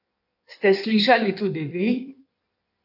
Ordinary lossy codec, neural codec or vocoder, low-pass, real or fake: none; codec, 16 kHz in and 24 kHz out, 1.1 kbps, FireRedTTS-2 codec; 5.4 kHz; fake